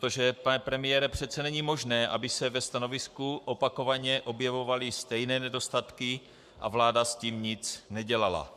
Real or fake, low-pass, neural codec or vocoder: fake; 14.4 kHz; codec, 44.1 kHz, 7.8 kbps, Pupu-Codec